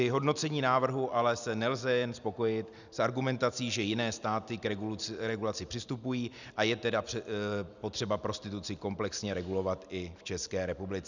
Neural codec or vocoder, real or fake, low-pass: none; real; 7.2 kHz